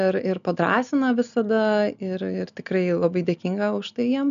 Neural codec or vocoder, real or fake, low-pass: none; real; 7.2 kHz